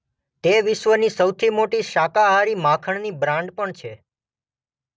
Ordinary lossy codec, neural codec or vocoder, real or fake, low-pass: none; none; real; none